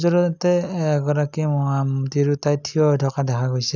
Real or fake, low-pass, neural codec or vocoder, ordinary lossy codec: real; 7.2 kHz; none; none